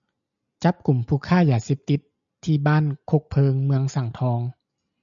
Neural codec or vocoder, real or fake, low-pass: none; real; 7.2 kHz